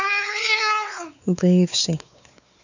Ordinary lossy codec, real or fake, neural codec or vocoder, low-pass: none; fake; codec, 16 kHz, 4 kbps, X-Codec, WavLM features, trained on Multilingual LibriSpeech; 7.2 kHz